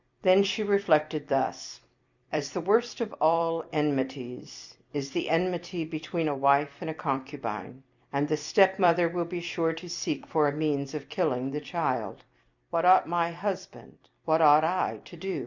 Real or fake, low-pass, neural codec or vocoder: fake; 7.2 kHz; vocoder, 44.1 kHz, 128 mel bands every 256 samples, BigVGAN v2